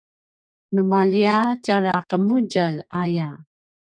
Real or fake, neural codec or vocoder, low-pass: fake; codec, 32 kHz, 1.9 kbps, SNAC; 9.9 kHz